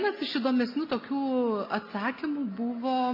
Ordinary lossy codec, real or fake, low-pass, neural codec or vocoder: MP3, 32 kbps; real; 5.4 kHz; none